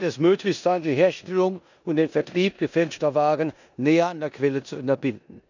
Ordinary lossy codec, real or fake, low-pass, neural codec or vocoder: none; fake; 7.2 kHz; codec, 16 kHz in and 24 kHz out, 0.9 kbps, LongCat-Audio-Codec, four codebook decoder